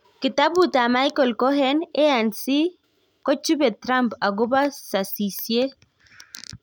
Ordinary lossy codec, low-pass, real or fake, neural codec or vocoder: none; none; real; none